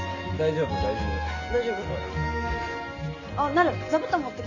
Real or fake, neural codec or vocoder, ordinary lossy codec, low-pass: real; none; AAC, 48 kbps; 7.2 kHz